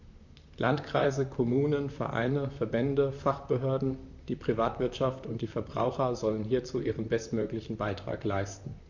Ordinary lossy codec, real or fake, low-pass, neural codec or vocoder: none; fake; 7.2 kHz; vocoder, 44.1 kHz, 128 mel bands, Pupu-Vocoder